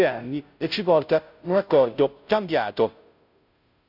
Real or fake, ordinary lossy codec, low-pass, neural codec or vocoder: fake; none; 5.4 kHz; codec, 16 kHz, 0.5 kbps, FunCodec, trained on Chinese and English, 25 frames a second